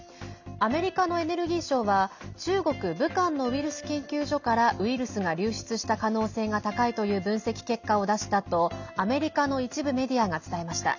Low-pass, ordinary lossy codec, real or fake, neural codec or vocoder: 7.2 kHz; none; real; none